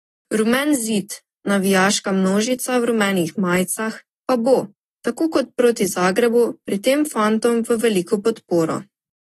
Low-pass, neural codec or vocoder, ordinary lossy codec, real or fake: 19.8 kHz; none; AAC, 32 kbps; real